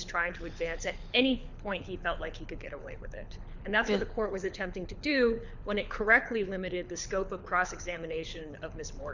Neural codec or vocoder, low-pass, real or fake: codec, 24 kHz, 6 kbps, HILCodec; 7.2 kHz; fake